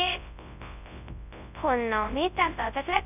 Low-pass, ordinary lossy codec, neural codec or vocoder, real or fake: 3.6 kHz; none; codec, 24 kHz, 0.9 kbps, WavTokenizer, large speech release; fake